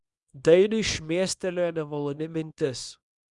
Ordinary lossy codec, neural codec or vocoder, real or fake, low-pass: Opus, 64 kbps; codec, 24 kHz, 0.9 kbps, WavTokenizer, small release; fake; 10.8 kHz